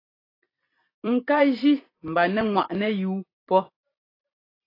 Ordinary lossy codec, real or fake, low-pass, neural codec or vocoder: AAC, 24 kbps; fake; 5.4 kHz; vocoder, 44.1 kHz, 128 mel bands every 256 samples, BigVGAN v2